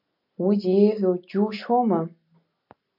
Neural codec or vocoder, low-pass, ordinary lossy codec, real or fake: none; 5.4 kHz; MP3, 48 kbps; real